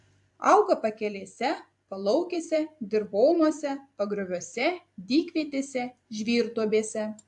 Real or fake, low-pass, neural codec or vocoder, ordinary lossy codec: fake; 10.8 kHz; vocoder, 44.1 kHz, 128 mel bands every 256 samples, BigVGAN v2; AAC, 64 kbps